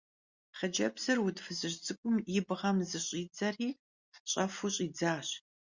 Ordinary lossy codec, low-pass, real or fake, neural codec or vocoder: Opus, 64 kbps; 7.2 kHz; real; none